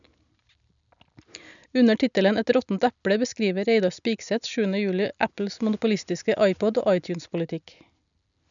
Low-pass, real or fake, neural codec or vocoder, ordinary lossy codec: 7.2 kHz; real; none; none